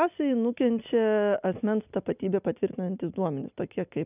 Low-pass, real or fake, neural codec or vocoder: 3.6 kHz; real; none